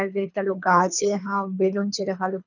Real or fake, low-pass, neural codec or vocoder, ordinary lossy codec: fake; 7.2 kHz; codec, 24 kHz, 3 kbps, HILCodec; none